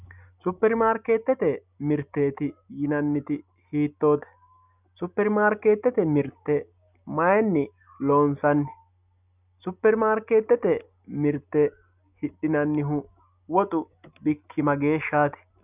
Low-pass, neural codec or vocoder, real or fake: 3.6 kHz; none; real